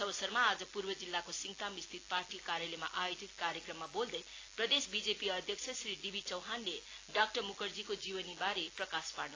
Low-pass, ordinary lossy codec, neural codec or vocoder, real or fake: 7.2 kHz; AAC, 32 kbps; none; real